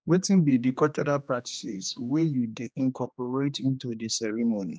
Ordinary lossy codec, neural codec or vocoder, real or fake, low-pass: none; codec, 16 kHz, 2 kbps, X-Codec, HuBERT features, trained on general audio; fake; none